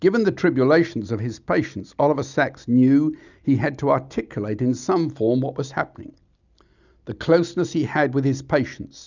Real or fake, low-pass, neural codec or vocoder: real; 7.2 kHz; none